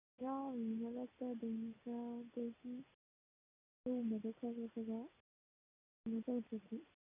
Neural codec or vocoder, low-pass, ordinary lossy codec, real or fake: none; 3.6 kHz; none; real